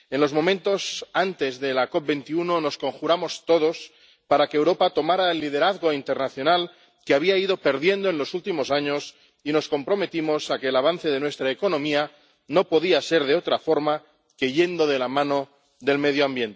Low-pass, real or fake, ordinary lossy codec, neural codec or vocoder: none; real; none; none